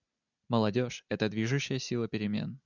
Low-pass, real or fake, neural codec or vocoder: 7.2 kHz; real; none